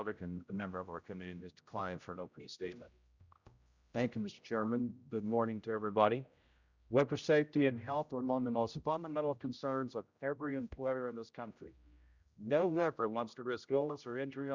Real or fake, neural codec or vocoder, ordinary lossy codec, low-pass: fake; codec, 16 kHz, 0.5 kbps, X-Codec, HuBERT features, trained on general audio; Opus, 64 kbps; 7.2 kHz